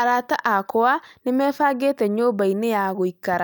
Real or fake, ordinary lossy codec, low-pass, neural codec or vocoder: real; none; none; none